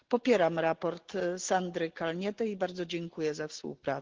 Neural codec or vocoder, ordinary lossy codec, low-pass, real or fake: none; Opus, 16 kbps; 7.2 kHz; real